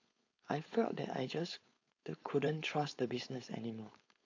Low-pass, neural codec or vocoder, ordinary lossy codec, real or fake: 7.2 kHz; codec, 16 kHz, 4.8 kbps, FACodec; AAC, 48 kbps; fake